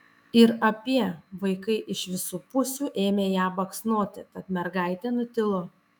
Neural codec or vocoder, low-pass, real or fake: autoencoder, 48 kHz, 128 numbers a frame, DAC-VAE, trained on Japanese speech; 19.8 kHz; fake